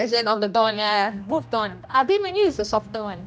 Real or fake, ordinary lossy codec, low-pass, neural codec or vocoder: fake; none; none; codec, 16 kHz, 1 kbps, X-Codec, HuBERT features, trained on general audio